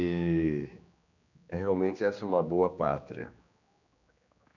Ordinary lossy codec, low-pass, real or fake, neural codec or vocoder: none; 7.2 kHz; fake; codec, 16 kHz, 2 kbps, X-Codec, HuBERT features, trained on general audio